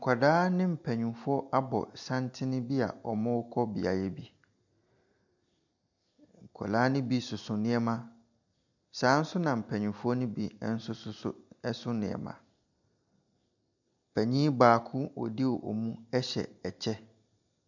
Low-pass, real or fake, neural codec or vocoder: 7.2 kHz; real; none